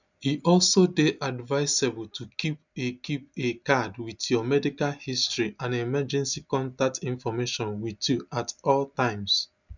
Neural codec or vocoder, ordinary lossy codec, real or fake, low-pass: none; none; real; 7.2 kHz